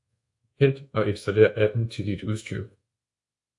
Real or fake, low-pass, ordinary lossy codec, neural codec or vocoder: fake; 10.8 kHz; AAC, 64 kbps; codec, 24 kHz, 1.2 kbps, DualCodec